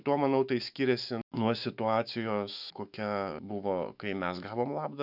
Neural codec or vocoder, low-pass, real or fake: autoencoder, 48 kHz, 128 numbers a frame, DAC-VAE, trained on Japanese speech; 5.4 kHz; fake